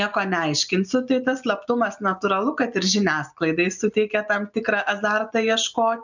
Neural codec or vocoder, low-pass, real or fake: none; 7.2 kHz; real